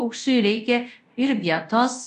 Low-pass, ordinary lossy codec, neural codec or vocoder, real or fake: 10.8 kHz; MP3, 64 kbps; codec, 24 kHz, 0.5 kbps, DualCodec; fake